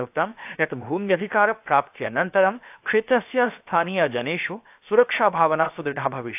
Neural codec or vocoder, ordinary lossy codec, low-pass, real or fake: codec, 16 kHz, 0.7 kbps, FocalCodec; AAC, 32 kbps; 3.6 kHz; fake